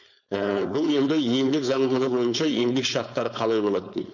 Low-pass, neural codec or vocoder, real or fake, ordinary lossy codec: 7.2 kHz; codec, 16 kHz, 4.8 kbps, FACodec; fake; none